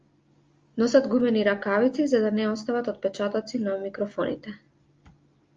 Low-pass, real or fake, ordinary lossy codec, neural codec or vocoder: 7.2 kHz; real; Opus, 32 kbps; none